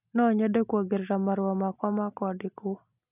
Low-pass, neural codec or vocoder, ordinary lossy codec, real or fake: 3.6 kHz; none; none; real